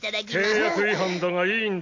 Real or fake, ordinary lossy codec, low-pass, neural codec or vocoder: real; MP3, 64 kbps; 7.2 kHz; none